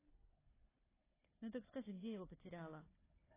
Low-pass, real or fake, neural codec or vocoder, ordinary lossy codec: 3.6 kHz; fake; codec, 16 kHz, 4 kbps, FunCodec, trained on Chinese and English, 50 frames a second; AAC, 16 kbps